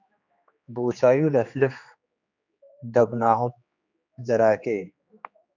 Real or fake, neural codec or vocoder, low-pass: fake; codec, 16 kHz, 2 kbps, X-Codec, HuBERT features, trained on general audio; 7.2 kHz